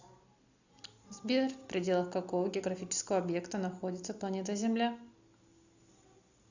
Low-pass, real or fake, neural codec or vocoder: 7.2 kHz; real; none